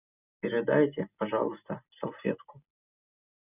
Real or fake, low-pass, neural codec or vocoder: real; 3.6 kHz; none